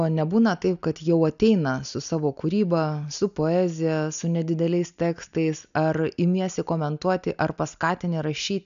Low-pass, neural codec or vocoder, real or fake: 7.2 kHz; none; real